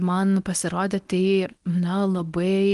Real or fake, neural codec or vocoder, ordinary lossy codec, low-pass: fake; codec, 24 kHz, 0.9 kbps, WavTokenizer, medium speech release version 1; Opus, 24 kbps; 10.8 kHz